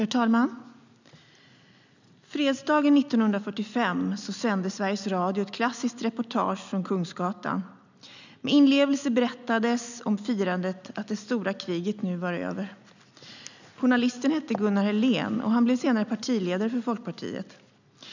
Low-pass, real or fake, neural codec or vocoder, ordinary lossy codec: 7.2 kHz; real; none; none